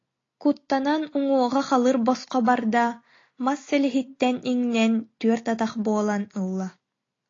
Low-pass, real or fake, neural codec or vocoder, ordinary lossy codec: 7.2 kHz; real; none; AAC, 32 kbps